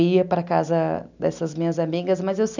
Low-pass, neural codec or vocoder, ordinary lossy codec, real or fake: 7.2 kHz; none; none; real